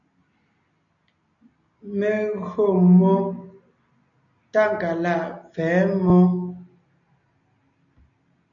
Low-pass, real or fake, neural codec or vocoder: 7.2 kHz; real; none